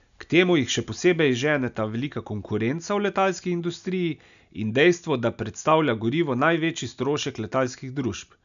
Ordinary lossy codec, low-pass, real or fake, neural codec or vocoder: none; 7.2 kHz; real; none